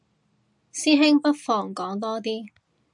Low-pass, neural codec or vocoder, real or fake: 10.8 kHz; none; real